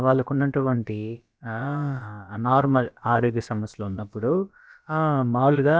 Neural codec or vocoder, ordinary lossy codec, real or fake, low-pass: codec, 16 kHz, about 1 kbps, DyCAST, with the encoder's durations; none; fake; none